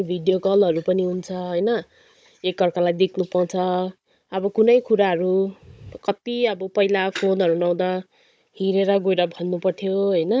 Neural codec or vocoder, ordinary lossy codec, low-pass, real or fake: codec, 16 kHz, 16 kbps, FunCodec, trained on Chinese and English, 50 frames a second; none; none; fake